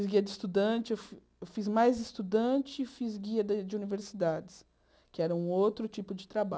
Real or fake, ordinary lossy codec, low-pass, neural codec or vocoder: real; none; none; none